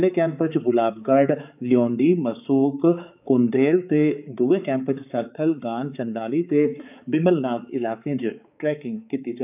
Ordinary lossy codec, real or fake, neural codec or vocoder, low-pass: none; fake; codec, 16 kHz, 4 kbps, X-Codec, HuBERT features, trained on balanced general audio; 3.6 kHz